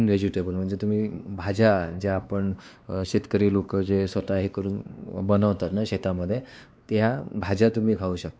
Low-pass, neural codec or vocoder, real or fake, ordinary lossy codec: none; codec, 16 kHz, 2 kbps, X-Codec, WavLM features, trained on Multilingual LibriSpeech; fake; none